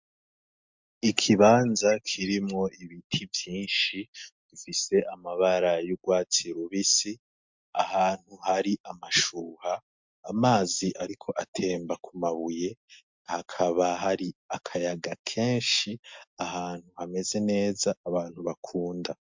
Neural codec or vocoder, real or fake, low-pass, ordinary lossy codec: none; real; 7.2 kHz; MP3, 64 kbps